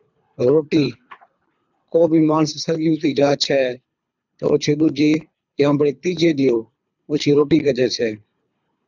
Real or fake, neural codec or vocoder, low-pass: fake; codec, 24 kHz, 3 kbps, HILCodec; 7.2 kHz